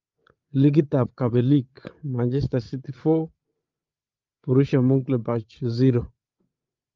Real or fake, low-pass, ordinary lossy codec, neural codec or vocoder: fake; 7.2 kHz; Opus, 32 kbps; codec, 16 kHz, 8 kbps, FreqCodec, larger model